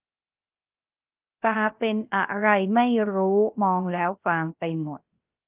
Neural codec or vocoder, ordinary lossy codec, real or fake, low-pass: codec, 16 kHz, 0.3 kbps, FocalCodec; Opus, 24 kbps; fake; 3.6 kHz